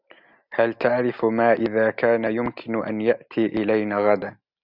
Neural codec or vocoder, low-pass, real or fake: none; 5.4 kHz; real